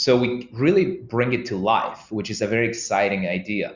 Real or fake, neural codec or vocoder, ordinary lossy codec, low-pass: real; none; Opus, 64 kbps; 7.2 kHz